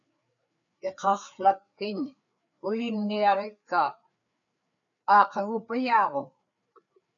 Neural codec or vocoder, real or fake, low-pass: codec, 16 kHz, 4 kbps, FreqCodec, larger model; fake; 7.2 kHz